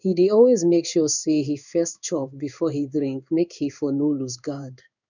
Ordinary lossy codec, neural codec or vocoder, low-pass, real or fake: none; codec, 16 kHz in and 24 kHz out, 1 kbps, XY-Tokenizer; 7.2 kHz; fake